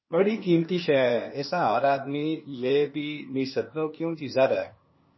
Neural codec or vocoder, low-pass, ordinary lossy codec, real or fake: codec, 16 kHz, 0.8 kbps, ZipCodec; 7.2 kHz; MP3, 24 kbps; fake